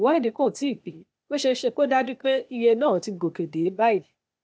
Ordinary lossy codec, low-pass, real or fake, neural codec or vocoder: none; none; fake; codec, 16 kHz, 0.8 kbps, ZipCodec